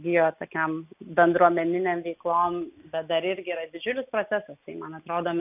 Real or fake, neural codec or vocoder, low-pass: real; none; 3.6 kHz